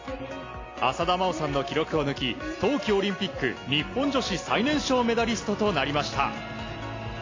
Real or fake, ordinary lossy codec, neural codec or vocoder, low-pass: real; AAC, 32 kbps; none; 7.2 kHz